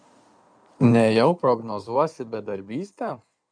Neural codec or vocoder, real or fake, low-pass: codec, 16 kHz in and 24 kHz out, 2.2 kbps, FireRedTTS-2 codec; fake; 9.9 kHz